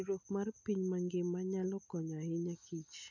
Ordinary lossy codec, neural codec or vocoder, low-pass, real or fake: none; none; 7.2 kHz; real